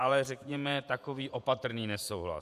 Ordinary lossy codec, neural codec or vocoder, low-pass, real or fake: MP3, 96 kbps; vocoder, 48 kHz, 128 mel bands, Vocos; 14.4 kHz; fake